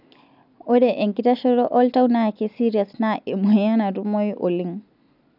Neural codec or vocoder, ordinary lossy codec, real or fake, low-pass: none; none; real; 5.4 kHz